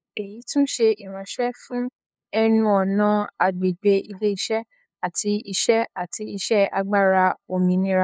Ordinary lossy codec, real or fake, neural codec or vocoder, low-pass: none; fake; codec, 16 kHz, 2 kbps, FunCodec, trained on LibriTTS, 25 frames a second; none